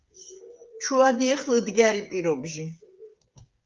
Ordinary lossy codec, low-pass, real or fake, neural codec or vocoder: Opus, 32 kbps; 7.2 kHz; fake; codec, 16 kHz, 8 kbps, FreqCodec, smaller model